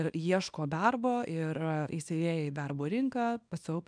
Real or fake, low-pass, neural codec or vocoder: fake; 9.9 kHz; codec, 24 kHz, 0.9 kbps, WavTokenizer, small release